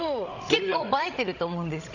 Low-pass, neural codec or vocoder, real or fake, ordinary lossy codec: 7.2 kHz; codec, 16 kHz, 16 kbps, FreqCodec, larger model; fake; none